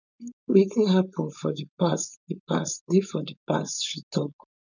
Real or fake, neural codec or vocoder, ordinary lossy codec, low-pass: fake; codec, 16 kHz, 4.8 kbps, FACodec; none; 7.2 kHz